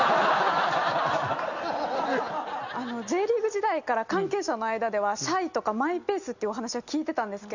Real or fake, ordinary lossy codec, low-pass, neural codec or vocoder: real; none; 7.2 kHz; none